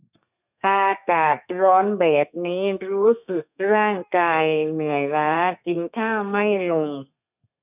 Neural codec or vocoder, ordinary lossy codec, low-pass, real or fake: codec, 32 kHz, 1.9 kbps, SNAC; none; 3.6 kHz; fake